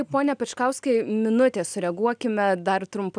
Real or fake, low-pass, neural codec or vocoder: real; 9.9 kHz; none